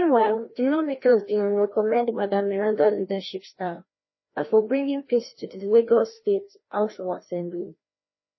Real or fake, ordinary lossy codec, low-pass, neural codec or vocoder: fake; MP3, 24 kbps; 7.2 kHz; codec, 16 kHz, 1 kbps, FreqCodec, larger model